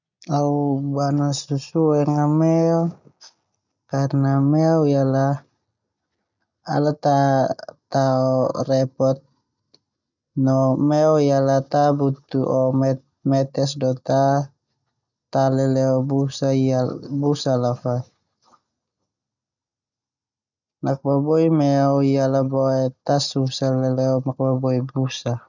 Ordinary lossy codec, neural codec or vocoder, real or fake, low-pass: none; none; real; 7.2 kHz